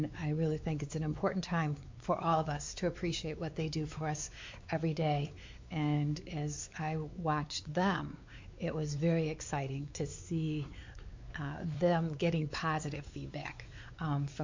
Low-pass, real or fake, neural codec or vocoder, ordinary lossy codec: 7.2 kHz; fake; codec, 16 kHz, 4 kbps, X-Codec, WavLM features, trained on Multilingual LibriSpeech; MP3, 48 kbps